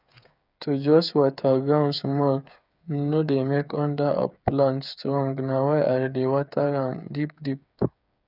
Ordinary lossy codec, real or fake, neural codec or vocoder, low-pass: none; fake; codec, 16 kHz, 8 kbps, FreqCodec, smaller model; 5.4 kHz